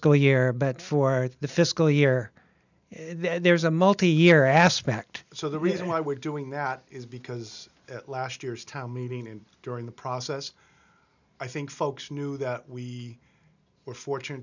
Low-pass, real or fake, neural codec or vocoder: 7.2 kHz; real; none